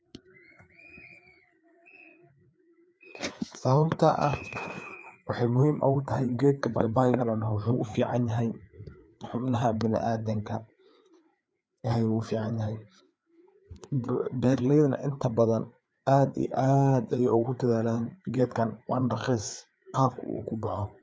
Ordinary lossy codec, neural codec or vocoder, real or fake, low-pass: none; codec, 16 kHz, 4 kbps, FreqCodec, larger model; fake; none